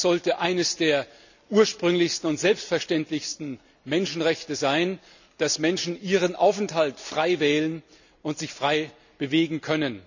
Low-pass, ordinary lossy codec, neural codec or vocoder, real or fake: 7.2 kHz; none; none; real